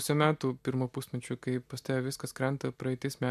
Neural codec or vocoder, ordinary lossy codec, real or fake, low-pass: none; MP3, 96 kbps; real; 14.4 kHz